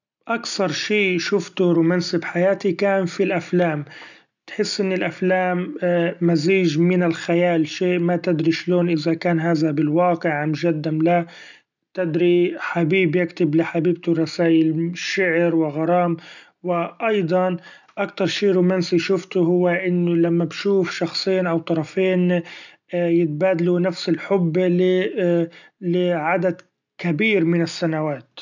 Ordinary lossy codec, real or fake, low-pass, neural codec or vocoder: none; real; 7.2 kHz; none